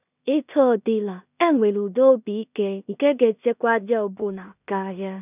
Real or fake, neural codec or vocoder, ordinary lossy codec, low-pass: fake; codec, 16 kHz in and 24 kHz out, 0.4 kbps, LongCat-Audio-Codec, two codebook decoder; none; 3.6 kHz